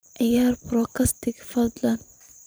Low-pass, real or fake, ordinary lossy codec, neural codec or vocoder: none; fake; none; vocoder, 44.1 kHz, 128 mel bands every 512 samples, BigVGAN v2